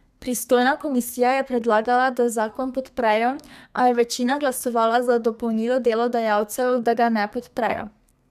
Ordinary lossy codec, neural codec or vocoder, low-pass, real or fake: none; codec, 32 kHz, 1.9 kbps, SNAC; 14.4 kHz; fake